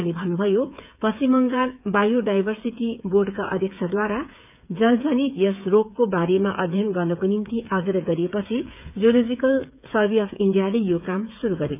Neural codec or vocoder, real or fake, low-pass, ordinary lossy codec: codec, 16 kHz, 8 kbps, FreqCodec, smaller model; fake; 3.6 kHz; none